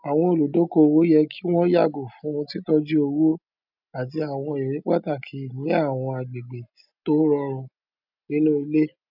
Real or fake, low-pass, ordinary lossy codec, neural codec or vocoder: real; 5.4 kHz; none; none